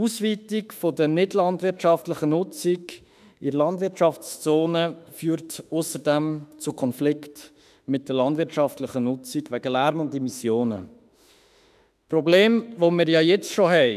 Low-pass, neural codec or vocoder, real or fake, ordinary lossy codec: 14.4 kHz; autoencoder, 48 kHz, 32 numbers a frame, DAC-VAE, trained on Japanese speech; fake; none